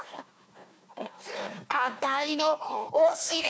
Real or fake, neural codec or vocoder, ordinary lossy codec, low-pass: fake; codec, 16 kHz, 1 kbps, FunCodec, trained on Chinese and English, 50 frames a second; none; none